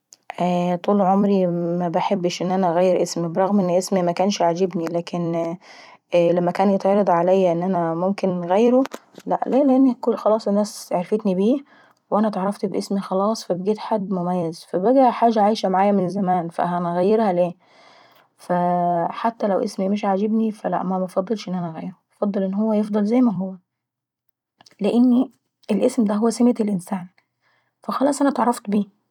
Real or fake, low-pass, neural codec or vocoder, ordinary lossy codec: fake; 19.8 kHz; vocoder, 44.1 kHz, 128 mel bands every 256 samples, BigVGAN v2; none